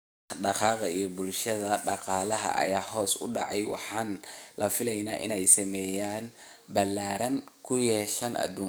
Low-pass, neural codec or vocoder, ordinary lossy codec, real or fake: none; codec, 44.1 kHz, 7.8 kbps, DAC; none; fake